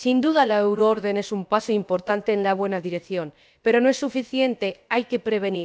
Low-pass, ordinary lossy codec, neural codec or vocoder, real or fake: none; none; codec, 16 kHz, about 1 kbps, DyCAST, with the encoder's durations; fake